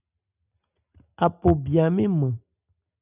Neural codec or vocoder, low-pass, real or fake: none; 3.6 kHz; real